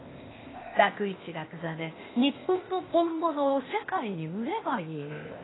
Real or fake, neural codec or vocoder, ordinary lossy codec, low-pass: fake; codec, 16 kHz, 0.8 kbps, ZipCodec; AAC, 16 kbps; 7.2 kHz